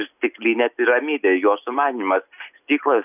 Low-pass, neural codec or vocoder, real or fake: 3.6 kHz; none; real